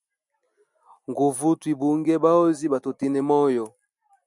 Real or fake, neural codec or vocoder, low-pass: real; none; 10.8 kHz